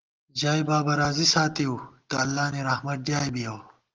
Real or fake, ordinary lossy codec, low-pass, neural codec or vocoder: real; Opus, 24 kbps; 7.2 kHz; none